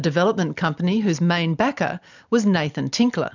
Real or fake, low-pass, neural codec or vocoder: real; 7.2 kHz; none